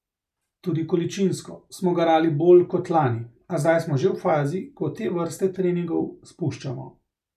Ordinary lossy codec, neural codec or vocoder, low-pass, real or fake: none; none; 14.4 kHz; real